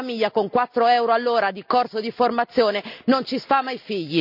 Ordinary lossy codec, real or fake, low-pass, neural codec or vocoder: none; real; 5.4 kHz; none